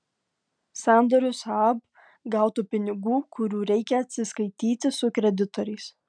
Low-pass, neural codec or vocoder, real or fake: 9.9 kHz; none; real